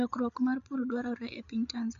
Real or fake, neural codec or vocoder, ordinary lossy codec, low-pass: fake; codec, 16 kHz, 8 kbps, FreqCodec, larger model; none; 7.2 kHz